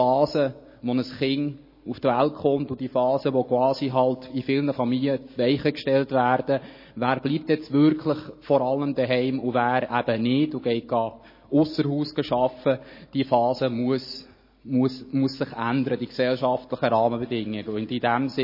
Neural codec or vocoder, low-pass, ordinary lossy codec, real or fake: none; 5.4 kHz; MP3, 24 kbps; real